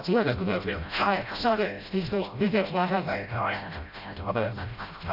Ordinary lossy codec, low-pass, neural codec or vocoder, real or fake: none; 5.4 kHz; codec, 16 kHz, 0.5 kbps, FreqCodec, smaller model; fake